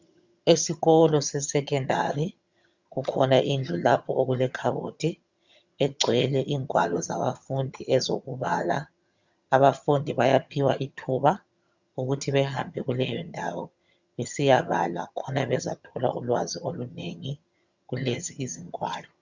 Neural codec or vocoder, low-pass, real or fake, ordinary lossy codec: vocoder, 22.05 kHz, 80 mel bands, HiFi-GAN; 7.2 kHz; fake; Opus, 64 kbps